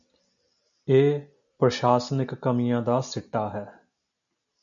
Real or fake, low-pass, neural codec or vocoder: real; 7.2 kHz; none